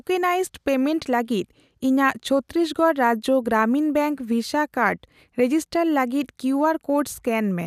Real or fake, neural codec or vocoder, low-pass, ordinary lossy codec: real; none; 14.4 kHz; none